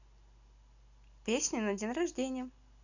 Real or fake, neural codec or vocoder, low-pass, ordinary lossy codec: real; none; 7.2 kHz; none